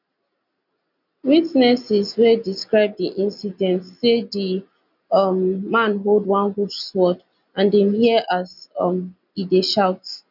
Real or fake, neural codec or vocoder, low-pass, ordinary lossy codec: real; none; 5.4 kHz; none